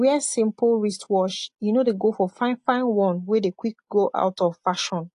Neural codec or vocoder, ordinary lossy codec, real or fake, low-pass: none; AAC, 48 kbps; real; 10.8 kHz